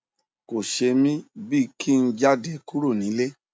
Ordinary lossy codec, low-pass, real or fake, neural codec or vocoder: none; none; real; none